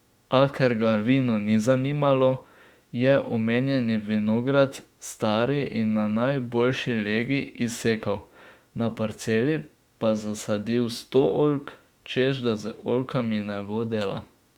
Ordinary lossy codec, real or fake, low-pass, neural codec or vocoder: Opus, 64 kbps; fake; 19.8 kHz; autoencoder, 48 kHz, 32 numbers a frame, DAC-VAE, trained on Japanese speech